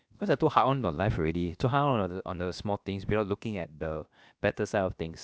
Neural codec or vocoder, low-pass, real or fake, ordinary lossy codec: codec, 16 kHz, about 1 kbps, DyCAST, with the encoder's durations; none; fake; none